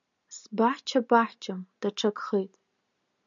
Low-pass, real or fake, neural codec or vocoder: 7.2 kHz; real; none